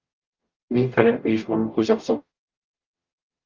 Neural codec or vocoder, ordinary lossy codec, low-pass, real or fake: codec, 44.1 kHz, 0.9 kbps, DAC; Opus, 32 kbps; 7.2 kHz; fake